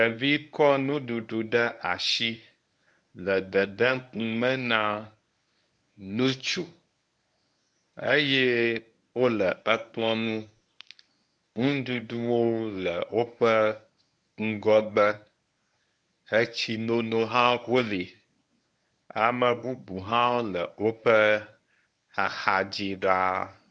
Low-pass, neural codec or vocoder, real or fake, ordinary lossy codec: 9.9 kHz; codec, 24 kHz, 0.9 kbps, WavTokenizer, medium speech release version 1; fake; MP3, 96 kbps